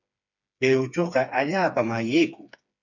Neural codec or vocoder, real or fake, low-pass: codec, 16 kHz, 4 kbps, FreqCodec, smaller model; fake; 7.2 kHz